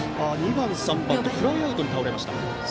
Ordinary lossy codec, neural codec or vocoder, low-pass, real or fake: none; none; none; real